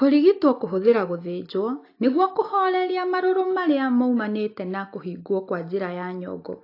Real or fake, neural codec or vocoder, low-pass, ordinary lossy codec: real; none; 5.4 kHz; AAC, 32 kbps